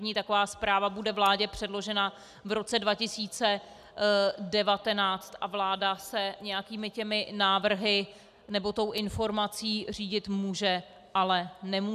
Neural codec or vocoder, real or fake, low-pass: none; real; 14.4 kHz